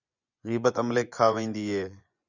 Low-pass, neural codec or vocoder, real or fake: 7.2 kHz; vocoder, 24 kHz, 100 mel bands, Vocos; fake